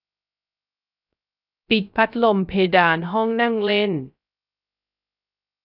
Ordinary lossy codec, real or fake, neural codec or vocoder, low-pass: none; fake; codec, 16 kHz, 0.3 kbps, FocalCodec; 5.4 kHz